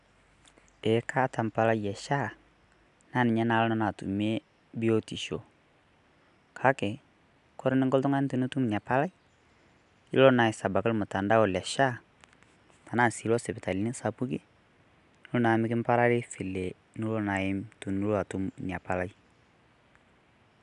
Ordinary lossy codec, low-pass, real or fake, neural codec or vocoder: none; 10.8 kHz; real; none